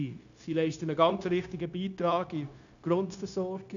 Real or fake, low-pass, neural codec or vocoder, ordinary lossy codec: fake; 7.2 kHz; codec, 16 kHz, 0.9 kbps, LongCat-Audio-Codec; none